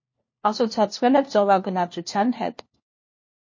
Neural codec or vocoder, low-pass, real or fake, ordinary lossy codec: codec, 16 kHz, 1 kbps, FunCodec, trained on LibriTTS, 50 frames a second; 7.2 kHz; fake; MP3, 32 kbps